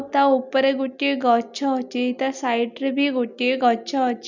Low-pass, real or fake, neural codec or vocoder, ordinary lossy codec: 7.2 kHz; real; none; none